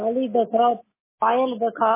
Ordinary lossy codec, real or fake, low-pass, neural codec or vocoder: MP3, 16 kbps; real; 3.6 kHz; none